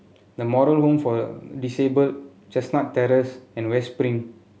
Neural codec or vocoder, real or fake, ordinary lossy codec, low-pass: none; real; none; none